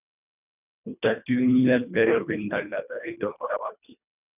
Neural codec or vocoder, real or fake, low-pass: codec, 24 kHz, 1.5 kbps, HILCodec; fake; 3.6 kHz